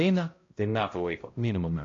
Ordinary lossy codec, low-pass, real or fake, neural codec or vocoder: AAC, 32 kbps; 7.2 kHz; fake; codec, 16 kHz, 0.5 kbps, X-Codec, HuBERT features, trained on balanced general audio